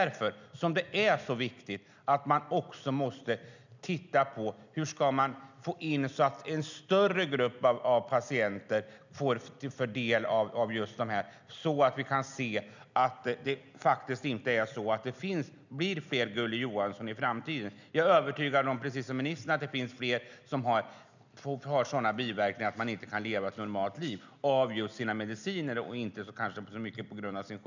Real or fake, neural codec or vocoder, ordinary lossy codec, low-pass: real; none; none; 7.2 kHz